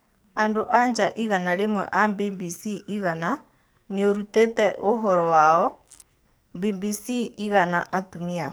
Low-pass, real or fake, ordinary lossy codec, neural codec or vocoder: none; fake; none; codec, 44.1 kHz, 2.6 kbps, SNAC